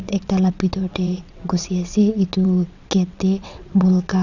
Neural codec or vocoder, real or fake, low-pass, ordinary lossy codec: vocoder, 22.05 kHz, 80 mel bands, WaveNeXt; fake; 7.2 kHz; none